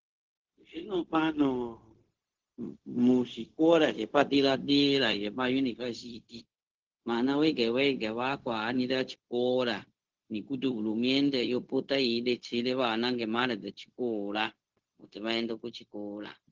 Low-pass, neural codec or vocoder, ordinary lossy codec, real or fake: 7.2 kHz; codec, 16 kHz, 0.4 kbps, LongCat-Audio-Codec; Opus, 16 kbps; fake